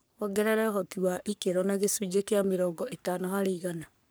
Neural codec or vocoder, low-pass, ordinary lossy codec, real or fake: codec, 44.1 kHz, 3.4 kbps, Pupu-Codec; none; none; fake